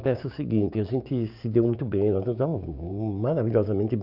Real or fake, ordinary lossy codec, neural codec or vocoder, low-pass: fake; none; codec, 16 kHz, 6 kbps, DAC; 5.4 kHz